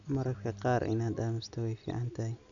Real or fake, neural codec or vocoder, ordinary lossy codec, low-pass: real; none; none; 7.2 kHz